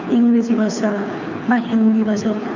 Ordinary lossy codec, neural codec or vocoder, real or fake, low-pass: none; codec, 24 kHz, 6 kbps, HILCodec; fake; 7.2 kHz